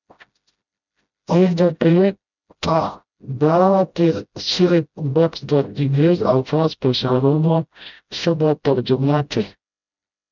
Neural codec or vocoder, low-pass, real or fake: codec, 16 kHz, 0.5 kbps, FreqCodec, smaller model; 7.2 kHz; fake